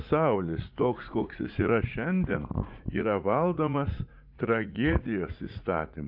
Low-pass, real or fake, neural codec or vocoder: 5.4 kHz; fake; vocoder, 44.1 kHz, 80 mel bands, Vocos